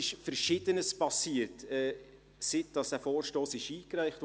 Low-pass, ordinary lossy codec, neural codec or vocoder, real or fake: none; none; none; real